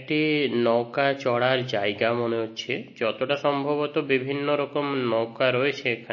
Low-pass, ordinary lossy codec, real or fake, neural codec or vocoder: 7.2 kHz; MP3, 32 kbps; real; none